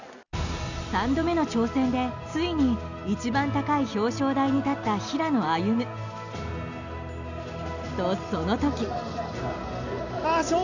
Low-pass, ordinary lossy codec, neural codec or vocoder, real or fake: 7.2 kHz; none; none; real